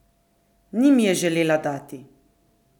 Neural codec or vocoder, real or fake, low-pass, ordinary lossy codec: none; real; 19.8 kHz; none